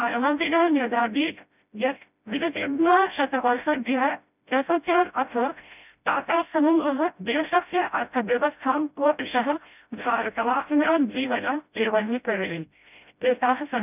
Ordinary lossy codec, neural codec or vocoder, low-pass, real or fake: none; codec, 16 kHz, 0.5 kbps, FreqCodec, smaller model; 3.6 kHz; fake